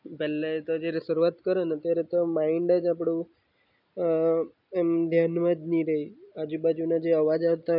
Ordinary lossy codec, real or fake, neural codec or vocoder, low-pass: none; real; none; 5.4 kHz